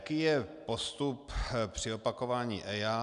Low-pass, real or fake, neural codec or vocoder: 10.8 kHz; real; none